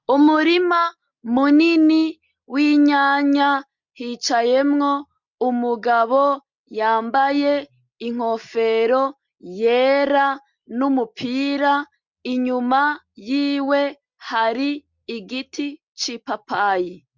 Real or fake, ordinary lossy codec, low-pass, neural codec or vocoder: real; MP3, 64 kbps; 7.2 kHz; none